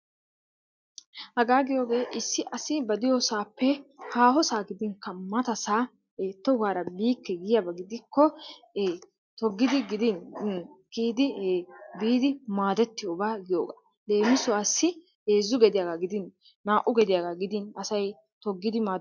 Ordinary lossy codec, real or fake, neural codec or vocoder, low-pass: MP3, 64 kbps; real; none; 7.2 kHz